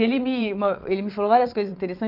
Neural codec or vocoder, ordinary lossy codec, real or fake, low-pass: vocoder, 22.05 kHz, 80 mel bands, WaveNeXt; none; fake; 5.4 kHz